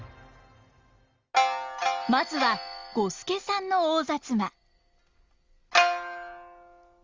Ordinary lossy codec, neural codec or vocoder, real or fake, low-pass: Opus, 32 kbps; none; real; 7.2 kHz